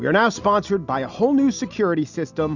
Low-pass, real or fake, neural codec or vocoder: 7.2 kHz; real; none